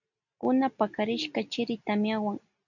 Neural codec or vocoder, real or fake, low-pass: none; real; 7.2 kHz